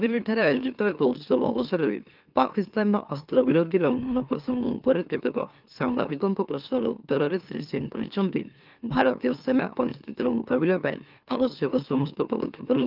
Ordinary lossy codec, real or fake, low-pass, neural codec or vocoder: Opus, 24 kbps; fake; 5.4 kHz; autoencoder, 44.1 kHz, a latent of 192 numbers a frame, MeloTTS